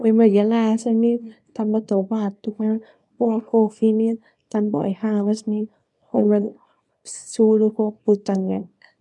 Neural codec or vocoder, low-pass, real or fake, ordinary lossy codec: codec, 24 kHz, 0.9 kbps, WavTokenizer, small release; 10.8 kHz; fake; none